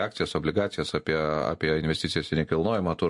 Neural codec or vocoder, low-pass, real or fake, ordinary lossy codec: none; 10.8 kHz; real; MP3, 48 kbps